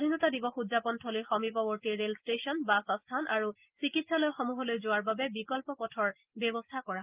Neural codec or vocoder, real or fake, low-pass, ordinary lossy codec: none; real; 3.6 kHz; Opus, 32 kbps